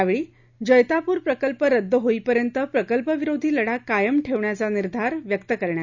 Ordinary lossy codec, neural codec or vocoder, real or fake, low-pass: none; none; real; 7.2 kHz